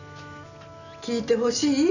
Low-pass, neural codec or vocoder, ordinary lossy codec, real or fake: 7.2 kHz; none; none; real